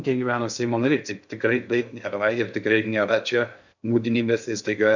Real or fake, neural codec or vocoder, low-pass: fake; codec, 16 kHz in and 24 kHz out, 0.6 kbps, FocalCodec, streaming, 2048 codes; 7.2 kHz